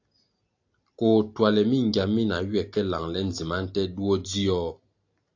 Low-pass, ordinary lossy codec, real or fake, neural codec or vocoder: 7.2 kHz; AAC, 48 kbps; real; none